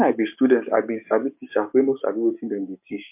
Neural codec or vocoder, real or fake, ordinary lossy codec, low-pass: codec, 16 kHz, 6 kbps, DAC; fake; MP3, 32 kbps; 3.6 kHz